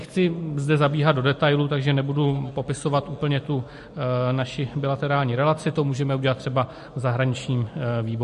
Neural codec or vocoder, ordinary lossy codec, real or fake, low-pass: none; MP3, 48 kbps; real; 14.4 kHz